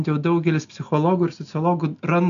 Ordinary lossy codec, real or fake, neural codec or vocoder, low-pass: MP3, 96 kbps; real; none; 7.2 kHz